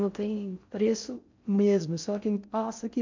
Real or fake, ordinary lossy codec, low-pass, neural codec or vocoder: fake; MP3, 64 kbps; 7.2 kHz; codec, 16 kHz in and 24 kHz out, 0.6 kbps, FocalCodec, streaming, 4096 codes